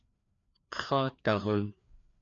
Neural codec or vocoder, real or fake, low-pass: codec, 16 kHz, 2 kbps, FreqCodec, larger model; fake; 7.2 kHz